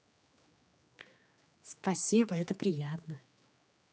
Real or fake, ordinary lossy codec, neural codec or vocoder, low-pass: fake; none; codec, 16 kHz, 2 kbps, X-Codec, HuBERT features, trained on general audio; none